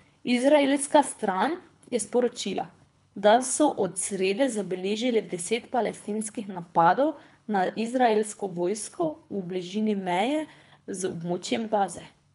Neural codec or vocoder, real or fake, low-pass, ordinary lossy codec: codec, 24 kHz, 3 kbps, HILCodec; fake; 10.8 kHz; none